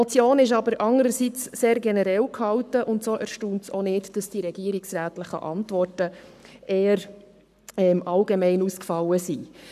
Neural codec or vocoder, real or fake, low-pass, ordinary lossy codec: codec, 44.1 kHz, 7.8 kbps, Pupu-Codec; fake; 14.4 kHz; none